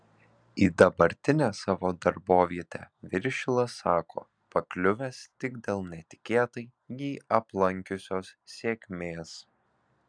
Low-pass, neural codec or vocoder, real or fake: 9.9 kHz; none; real